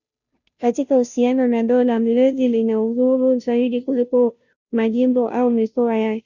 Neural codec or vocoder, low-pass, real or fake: codec, 16 kHz, 0.5 kbps, FunCodec, trained on Chinese and English, 25 frames a second; 7.2 kHz; fake